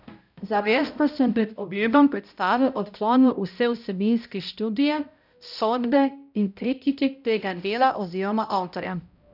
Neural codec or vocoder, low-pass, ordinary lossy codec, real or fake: codec, 16 kHz, 0.5 kbps, X-Codec, HuBERT features, trained on balanced general audio; 5.4 kHz; none; fake